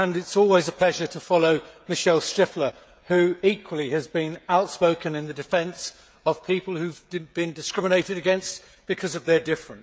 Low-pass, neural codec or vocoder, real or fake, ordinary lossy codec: none; codec, 16 kHz, 16 kbps, FreqCodec, smaller model; fake; none